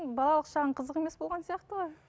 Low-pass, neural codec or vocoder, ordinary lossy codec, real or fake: none; none; none; real